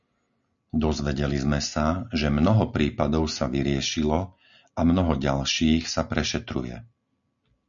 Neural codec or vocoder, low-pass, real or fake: none; 7.2 kHz; real